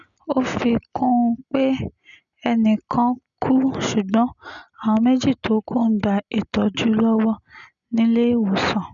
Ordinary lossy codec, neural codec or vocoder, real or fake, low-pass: none; none; real; 7.2 kHz